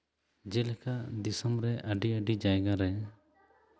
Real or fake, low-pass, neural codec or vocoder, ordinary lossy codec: real; none; none; none